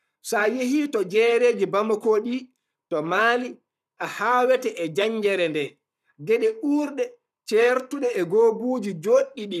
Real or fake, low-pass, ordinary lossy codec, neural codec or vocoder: fake; 14.4 kHz; none; codec, 44.1 kHz, 7.8 kbps, Pupu-Codec